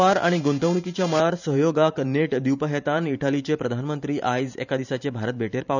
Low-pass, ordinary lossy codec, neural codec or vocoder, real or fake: 7.2 kHz; none; vocoder, 44.1 kHz, 128 mel bands every 512 samples, BigVGAN v2; fake